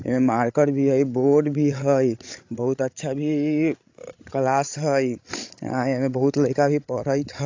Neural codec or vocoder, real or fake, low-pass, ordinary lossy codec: codec, 16 kHz, 16 kbps, FreqCodec, larger model; fake; 7.2 kHz; AAC, 48 kbps